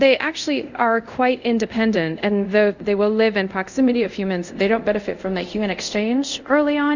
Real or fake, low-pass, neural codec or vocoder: fake; 7.2 kHz; codec, 24 kHz, 0.5 kbps, DualCodec